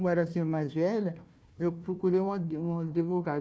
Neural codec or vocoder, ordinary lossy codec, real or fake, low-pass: codec, 16 kHz, 1 kbps, FunCodec, trained on Chinese and English, 50 frames a second; none; fake; none